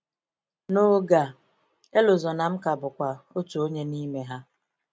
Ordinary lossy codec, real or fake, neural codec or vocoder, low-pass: none; real; none; none